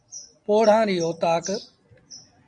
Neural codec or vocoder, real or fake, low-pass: none; real; 9.9 kHz